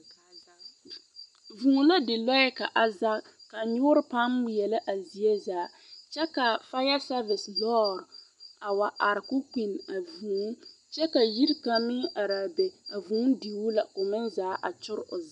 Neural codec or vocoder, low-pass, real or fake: none; 10.8 kHz; real